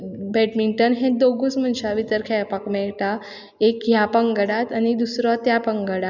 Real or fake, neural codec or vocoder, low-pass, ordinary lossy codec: real; none; 7.2 kHz; none